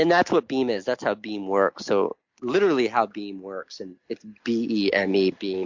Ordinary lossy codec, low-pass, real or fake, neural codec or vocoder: MP3, 64 kbps; 7.2 kHz; real; none